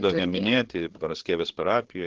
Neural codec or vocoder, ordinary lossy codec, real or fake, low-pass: codec, 16 kHz, 8 kbps, FreqCodec, larger model; Opus, 16 kbps; fake; 7.2 kHz